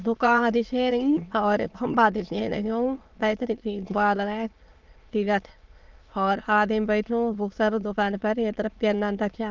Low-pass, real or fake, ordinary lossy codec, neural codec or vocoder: 7.2 kHz; fake; Opus, 32 kbps; autoencoder, 22.05 kHz, a latent of 192 numbers a frame, VITS, trained on many speakers